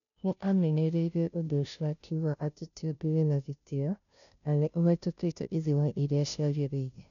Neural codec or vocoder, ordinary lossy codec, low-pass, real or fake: codec, 16 kHz, 0.5 kbps, FunCodec, trained on Chinese and English, 25 frames a second; none; 7.2 kHz; fake